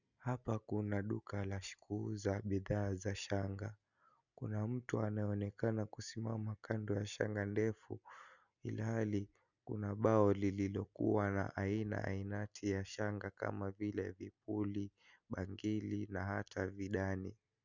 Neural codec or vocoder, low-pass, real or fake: none; 7.2 kHz; real